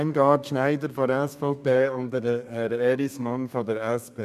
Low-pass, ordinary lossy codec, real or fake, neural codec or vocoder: 14.4 kHz; none; fake; codec, 32 kHz, 1.9 kbps, SNAC